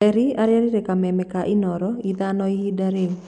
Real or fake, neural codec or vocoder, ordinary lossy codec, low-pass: real; none; none; 9.9 kHz